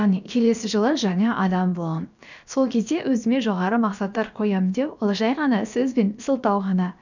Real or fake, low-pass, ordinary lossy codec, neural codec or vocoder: fake; 7.2 kHz; none; codec, 16 kHz, about 1 kbps, DyCAST, with the encoder's durations